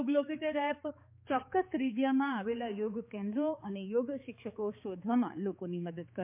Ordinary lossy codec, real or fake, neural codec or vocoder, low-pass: MP3, 32 kbps; fake; codec, 16 kHz, 4 kbps, X-Codec, HuBERT features, trained on balanced general audio; 3.6 kHz